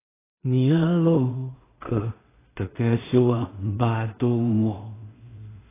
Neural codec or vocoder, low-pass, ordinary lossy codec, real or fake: codec, 16 kHz in and 24 kHz out, 0.4 kbps, LongCat-Audio-Codec, two codebook decoder; 3.6 kHz; AAC, 16 kbps; fake